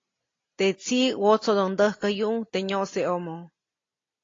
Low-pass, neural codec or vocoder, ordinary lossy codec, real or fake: 7.2 kHz; none; AAC, 32 kbps; real